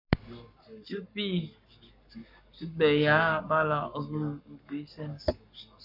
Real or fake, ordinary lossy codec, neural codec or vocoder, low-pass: fake; MP3, 48 kbps; codec, 44.1 kHz, 7.8 kbps, Pupu-Codec; 5.4 kHz